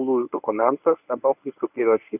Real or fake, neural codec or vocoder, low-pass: fake; codec, 24 kHz, 0.9 kbps, WavTokenizer, medium speech release version 1; 3.6 kHz